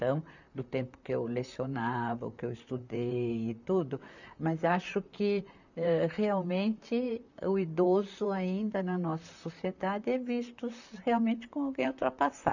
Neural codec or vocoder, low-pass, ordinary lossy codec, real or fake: vocoder, 44.1 kHz, 128 mel bands, Pupu-Vocoder; 7.2 kHz; none; fake